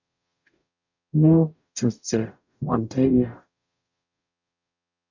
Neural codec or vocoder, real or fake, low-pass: codec, 44.1 kHz, 0.9 kbps, DAC; fake; 7.2 kHz